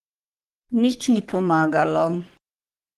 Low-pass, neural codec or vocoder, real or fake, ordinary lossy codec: 14.4 kHz; codec, 44.1 kHz, 3.4 kbps, Pupu-Codec; fake; Opus, 24 kbps